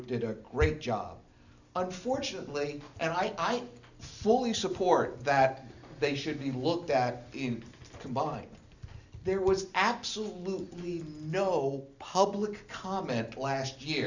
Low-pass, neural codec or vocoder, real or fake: 7.2 kHz; none; real